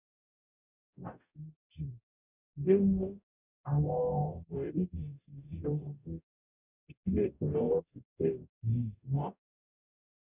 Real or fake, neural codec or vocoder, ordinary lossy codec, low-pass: fake; codec, 44.1 kHz, 0.9 kbps, DAC; none; 3.6 kHz